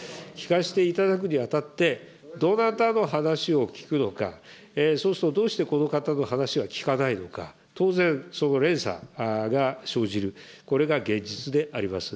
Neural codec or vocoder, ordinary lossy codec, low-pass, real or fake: none; none; none; real